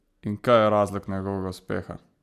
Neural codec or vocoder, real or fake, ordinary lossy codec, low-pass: none; real; none; 14.4 kHz